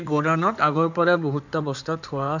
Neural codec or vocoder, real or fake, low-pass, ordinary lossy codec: codec, 16 kHz in and 24 kHz out, 2.2 kbps, FireRedTTS-2 codec; fake; 7.2 kHz; none